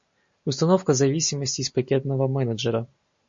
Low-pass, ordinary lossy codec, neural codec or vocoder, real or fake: 7.2 kHz; MP3, 48 kbps; none; real